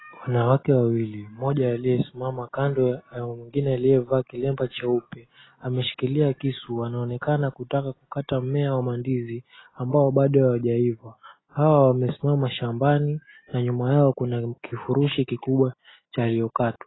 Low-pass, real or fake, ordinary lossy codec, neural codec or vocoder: 7.2 kHz; real; AAC, 16 kbps; none